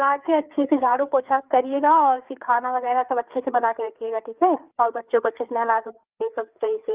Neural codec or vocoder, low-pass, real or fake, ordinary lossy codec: codec, 16 kHz, 4 kbps, FreqCodec, larger model; 3.6 kHz; fake; Opus, 32 kbps